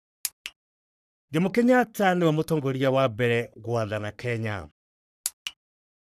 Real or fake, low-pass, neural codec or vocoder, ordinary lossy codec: fake; 14.4 kHz; codec, 44.1 kHz, 3.4 kbps, Pupu-Codec; none